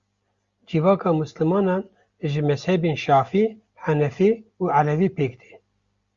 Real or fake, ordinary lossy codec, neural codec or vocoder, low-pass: real; Opus, 64 kbps; none; 7.2 kHz